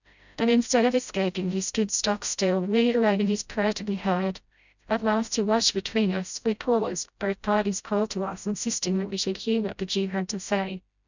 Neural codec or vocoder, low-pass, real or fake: codec, 16 kHz, 0.5 kbps, FreqCodec, smaller model; 7.2 kHz; fake